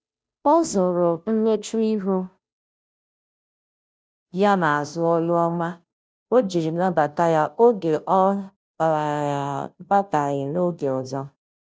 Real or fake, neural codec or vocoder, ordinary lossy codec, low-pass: fake; codec, 16 kHz, 0.5 kbps, FunCodec, trained on Chinese and English, 25 frames a second; none; none